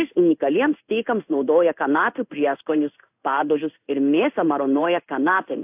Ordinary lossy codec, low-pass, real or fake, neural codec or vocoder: AAC, 32 kbps; 3.6 kHz; fake; codec, 16 kHz in and 24 kHz out, 1 kbps, XY-Tokenizer